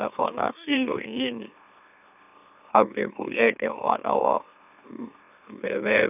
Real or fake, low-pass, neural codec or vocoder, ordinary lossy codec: fake; 3.6 kHz; autoencoder, 44.1 kHz, a latent of 192 numbers a frame, MeloTTS; none